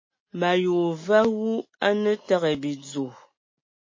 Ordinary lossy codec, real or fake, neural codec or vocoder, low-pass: MP3, 32 kbps; real; none; 7.2 kHz